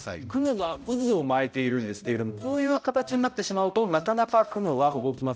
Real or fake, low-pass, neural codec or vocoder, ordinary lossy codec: fake; none; codec, 16 kHz, 0.5 kbps, X-Codec, HuBERT features, trained on balanced general audio; none